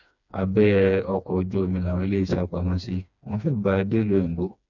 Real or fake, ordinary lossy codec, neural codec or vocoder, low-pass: fake; none; codec, 16 kHz, 2 kbps, FreqCodec, smaller model; 7.2 kHz